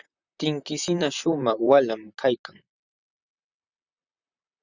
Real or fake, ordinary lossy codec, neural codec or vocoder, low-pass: fake; Opus, 64 kbps; vocoder, 44.1 kHz, 128 mel bands, Pupu-Vocoder; 7.2 kHz